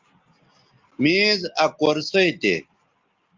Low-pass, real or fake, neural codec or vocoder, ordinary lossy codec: 7.2 kHz; real; none; Opus, 32 kbps